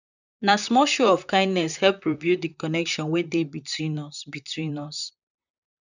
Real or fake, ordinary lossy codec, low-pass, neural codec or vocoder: fake; none; 7.2 kHz; vocoder, 44.1 kHz, 128 mel bands, Pupu-Vocoder